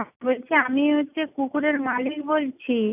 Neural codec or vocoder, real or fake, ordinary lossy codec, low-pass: none; real; none; 3.6 kHz